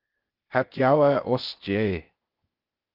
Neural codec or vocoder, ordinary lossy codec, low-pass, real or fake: codec, 16 kHz, 0.8 kbps, ZipCodec; Opus, 32 kbps; 5.4 kHz; fake